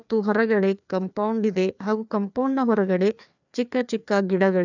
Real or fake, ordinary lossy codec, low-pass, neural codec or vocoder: fake; none; 7.2 kHz; codec, 16 kHz, 2 kbps, FreqCodec, larger model